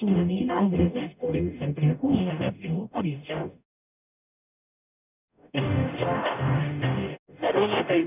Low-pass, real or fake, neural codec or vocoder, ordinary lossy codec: 3.6 kHz; fake; codec, 44.1 kHz, 0.9 kbps, DAC; none